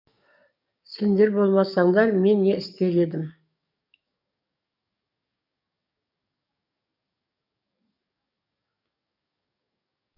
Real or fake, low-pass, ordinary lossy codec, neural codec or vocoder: fake; 5.4 kHz; none; codec, 44.1 kHz, 7.8 kbps, DAC